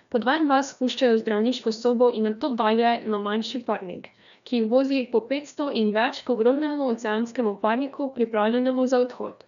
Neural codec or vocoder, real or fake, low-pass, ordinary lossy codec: codec, 16 kHz, 1 kbps, FreqCodec, larger model; fake; 7.2 kHz; none